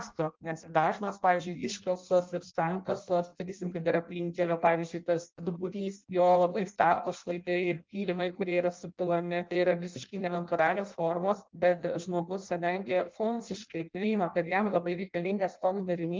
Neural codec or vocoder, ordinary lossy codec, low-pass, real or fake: codec, 16 kHz in and 24 kHz out, 0.6 kbps, FireRedTTS-2 codec; Opus, 32 kbps; 7.2 kHz; fake